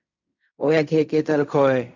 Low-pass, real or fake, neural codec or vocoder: 7.2 kHz; fake; codec, 16 kHz in and 24 kHz out, 0.4 kbps, LongCat-Audio-Codec, fine tuned four codebook decoder